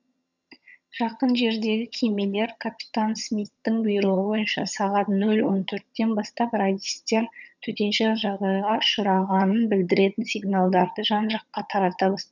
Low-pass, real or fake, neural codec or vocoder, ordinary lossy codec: 7.2 kHz; fake; vocoder, 22.05 kHz, 80 mel bands, HiFi-GAN; none